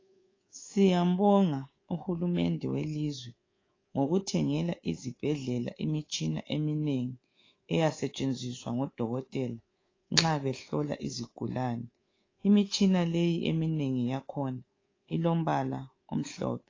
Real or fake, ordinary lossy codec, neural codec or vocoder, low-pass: fake; AAC, 32 kbps; codec, 24 kHz, 3.1 kbps, DualCodec; 7.2 kHz